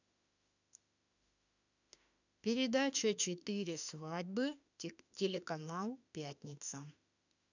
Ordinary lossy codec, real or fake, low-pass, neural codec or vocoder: none; fake; 7.2 kHz; autoencoder, 48 kHz, 32 numbers a frame, DAC-VAE, trained on Japanese speech